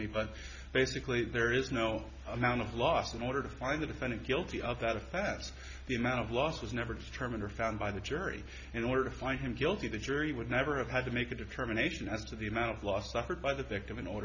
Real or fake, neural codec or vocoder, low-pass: real; none; 7.2 kHz